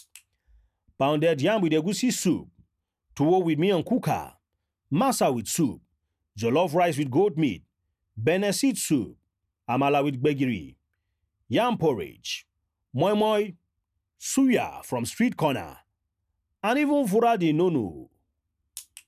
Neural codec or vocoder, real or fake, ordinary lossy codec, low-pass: none; real; none; 14.4 kHz